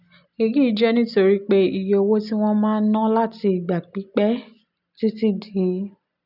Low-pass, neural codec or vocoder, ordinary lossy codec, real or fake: 5.4 kHz; none; none; real